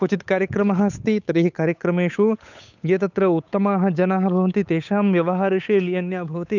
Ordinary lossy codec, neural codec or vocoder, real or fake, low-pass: none; codec, 16 kHz, 8 kbps, FunCodec, trained on Chinese and English, 25 frames a second; fake; 7.2 kHz